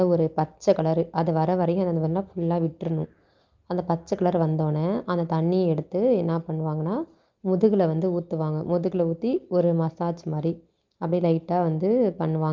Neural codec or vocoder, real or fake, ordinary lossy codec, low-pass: none; real; Opus, 32 kbps; 7.2 kHz